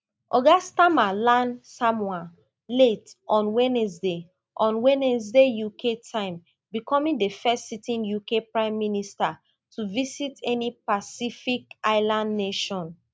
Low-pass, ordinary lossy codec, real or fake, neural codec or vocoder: none; none; real; none